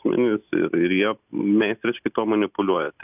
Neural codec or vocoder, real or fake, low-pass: none; real; 3.6 kHz